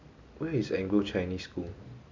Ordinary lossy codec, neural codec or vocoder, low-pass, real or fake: MP3, 64 kbps; none; 7.2 kHz; real